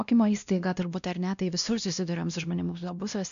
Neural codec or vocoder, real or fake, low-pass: codec, 16 kHz, 1 kbps, X-Codec, WavLM features, trained on Multilingual LibriSpeech; fake; 7.2 kHz